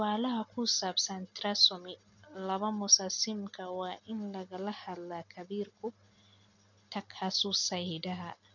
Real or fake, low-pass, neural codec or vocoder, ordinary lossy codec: real; 7.2 kHz; none; none